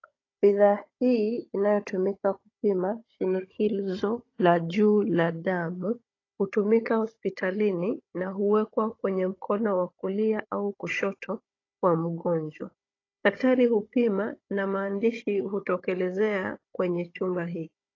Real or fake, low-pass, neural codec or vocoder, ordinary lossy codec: fake; 7.2 kHz; codec, 16 kHz, 16 kbps, FunCodec, trained on Chinese and English, 50 frames a second; AAC, 32 kbps